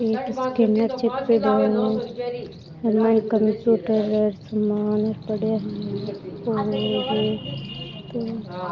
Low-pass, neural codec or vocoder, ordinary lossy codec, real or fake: 7.2 kHz; none; Opus, 24 kbps; real